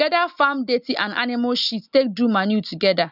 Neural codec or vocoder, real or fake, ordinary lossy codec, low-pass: none; real; none; 5.4 kHz